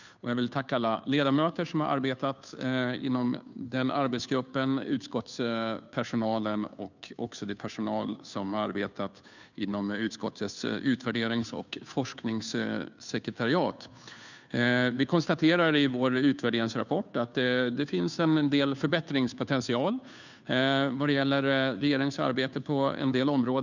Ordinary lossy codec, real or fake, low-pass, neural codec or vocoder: none; fake; 7.2 kHz; codec, 16 kHz, 2 kbps, FunCodec, trained on Chinese and English, 25 frames a second